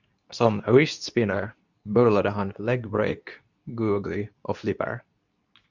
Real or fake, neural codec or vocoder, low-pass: fake; codec, 24 kHz, 0.9 kbps, WavTokenizer, medium speech release version 2; 7.2 kHz